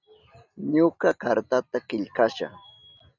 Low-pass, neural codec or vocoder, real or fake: 7.2 kHz; none; real